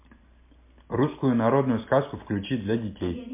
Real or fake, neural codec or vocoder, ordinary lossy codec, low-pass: real; none; MP3, 24 kbps; 3.6 kHz